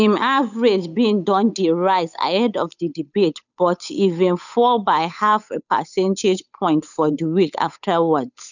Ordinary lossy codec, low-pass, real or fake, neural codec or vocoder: none; 7.2 kHz; fake; codec, 16 kHz, 8 kbps, FunCodec, trained on Chinese and English, 25 frames a second